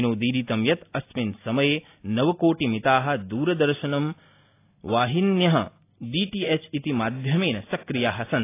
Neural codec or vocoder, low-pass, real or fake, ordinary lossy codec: none; 3.6 kHz; real; AAC, 24 kbps